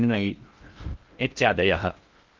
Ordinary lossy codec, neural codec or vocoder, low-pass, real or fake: Opus, 24 kbps; codec, 16 kHz in and 24 kHz out, 0.6 kbps, FocalCodec, streaming, 2048 codes; 7.2 kHz; fake